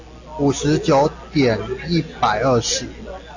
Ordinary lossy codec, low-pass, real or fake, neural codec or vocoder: AAC, 48 kbps; 7.2 kHz; real; none